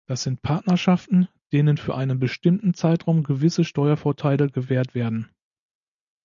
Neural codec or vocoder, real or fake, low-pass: none; real; 7.2 kHz